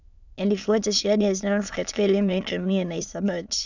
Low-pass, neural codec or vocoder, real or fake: 7.2 kHz; autoencoder, 22.05 kHz, a latent of 192 numbers a frame, VITS, trained on many speakers; fake